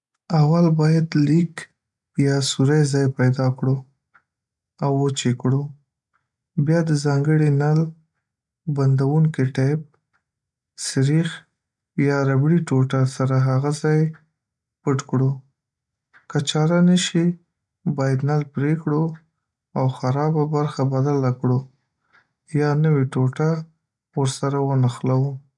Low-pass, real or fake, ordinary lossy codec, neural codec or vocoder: 10.8 kHz; real; MP3, 96 kbps; none